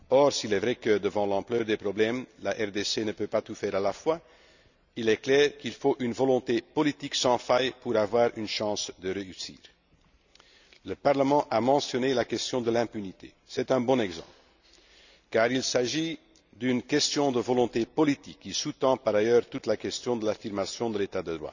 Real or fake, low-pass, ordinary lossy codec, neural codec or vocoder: real; 7.2 kHz; none; none